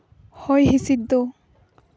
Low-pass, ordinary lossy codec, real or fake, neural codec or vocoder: none; none; real; none